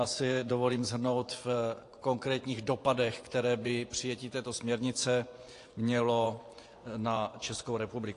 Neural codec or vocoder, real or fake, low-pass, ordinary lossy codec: vocoder, 24 kHz, 100 mel bands, Vocos; fake; 10.8 kHz; AAC, 48 kbps